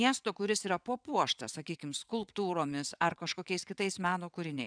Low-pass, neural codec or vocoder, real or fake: 9.9 kHz; vocoder, 22.05 kHz, 80 mel bands, WaveNeXt; fake